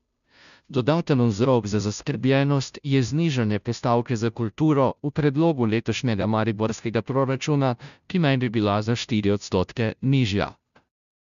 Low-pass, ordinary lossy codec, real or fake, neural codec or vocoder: 7.2 kHz; none; fake; codec, 16 kHz, 0.5 kbps, FunCodec, trained on Chinese and English, 25 frames a second